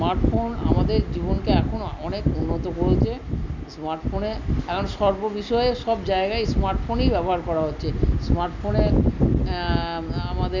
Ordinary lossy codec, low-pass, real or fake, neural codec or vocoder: none; 7.2 kHz; real; none